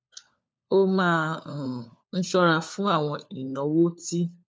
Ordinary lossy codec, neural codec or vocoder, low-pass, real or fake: none; codec, 16 kHz, 4 kbps, FunCodec, trained on LibriTTS, 50 frames a second; none; fake